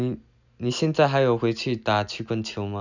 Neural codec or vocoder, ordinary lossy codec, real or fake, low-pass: none; none; real; 7.2 kHz